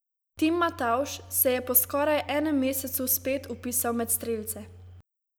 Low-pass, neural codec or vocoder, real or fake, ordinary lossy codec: none; none; real; none